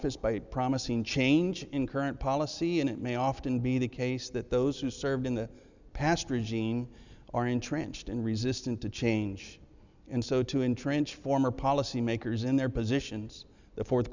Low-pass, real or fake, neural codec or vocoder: 7.2 kHz; real; none